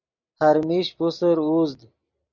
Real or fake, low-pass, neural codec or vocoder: real; 7.2 kHz; none